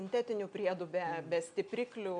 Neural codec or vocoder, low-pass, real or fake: none; 9.9 kHz; real